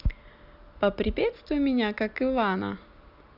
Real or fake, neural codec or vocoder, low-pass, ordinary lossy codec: real; none; 5.4 kHz; none